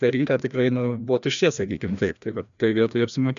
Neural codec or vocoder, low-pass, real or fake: codec, 16 kHz, 1 kbps, FreqCodec, larger model; 7.2 kHz; fake